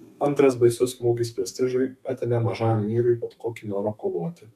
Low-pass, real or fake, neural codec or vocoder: 14.4 kHz; fake; codec, 32 kHz, 1.9 kbps, SNAC